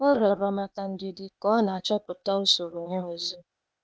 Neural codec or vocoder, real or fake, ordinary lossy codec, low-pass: codec, 16 kHz, 0.8 kbps, ZipCodec; fake; none; none